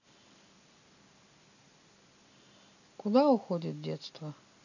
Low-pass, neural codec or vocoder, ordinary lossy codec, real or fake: 7.2 kHz; none; none; real